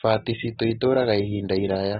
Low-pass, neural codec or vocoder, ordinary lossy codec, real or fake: 19.8 kHz; none; AAC, 16 kbps; real